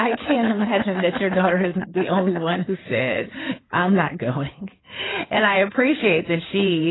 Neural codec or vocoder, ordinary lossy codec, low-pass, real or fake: codec, 16 kHz in and 24 kHz out, 2.2 kbps, FireRedTTS-2 codec; AAC, 16 kbps; 7.2 kHz; fake